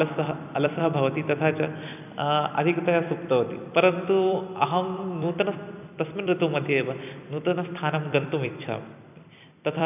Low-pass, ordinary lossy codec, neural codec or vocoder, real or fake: 3.6 kHz; none; vocoder, 44.1 kHz, 128 mel bands every 256 samples, BigVGAN v2; fake